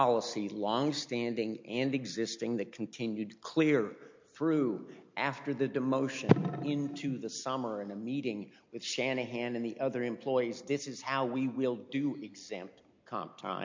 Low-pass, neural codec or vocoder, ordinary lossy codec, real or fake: 7.2 kHz; none; MP3, 48 kbps; real